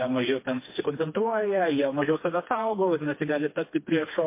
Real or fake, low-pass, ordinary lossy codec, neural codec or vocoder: fake; 3.6 kHz; MP3, 24 kbps; codec, 16 kHz, 2 kbps, FreqCodec, smaller model